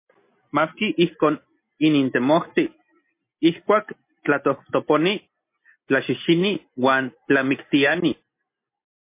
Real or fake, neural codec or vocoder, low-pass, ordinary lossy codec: real; none; 3.6 kHz; MP3, 24 kbps